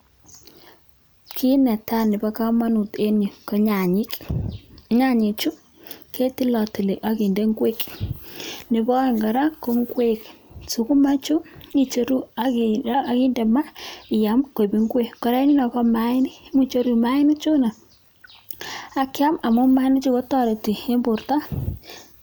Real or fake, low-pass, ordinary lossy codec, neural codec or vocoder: real; none; none; none